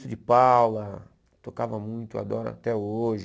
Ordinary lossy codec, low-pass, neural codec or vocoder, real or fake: none; none; none; real